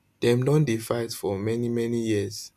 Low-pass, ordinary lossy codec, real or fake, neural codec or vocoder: 14.4 kHz; none; real; none